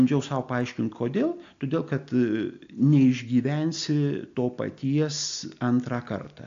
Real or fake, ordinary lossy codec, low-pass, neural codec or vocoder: real; AAC, 64 kbps; 7.2 kHz; none